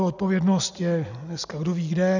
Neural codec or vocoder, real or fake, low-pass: none; real; 7.2 kHz